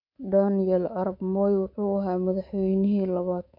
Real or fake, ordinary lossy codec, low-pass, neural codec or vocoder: fake; MP3, 48 kbps; 5.4 kHz; codec, 24 kHz, 3.1 kbps, DualCodec